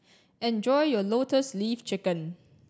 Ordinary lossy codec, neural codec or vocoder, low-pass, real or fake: none; none; none; real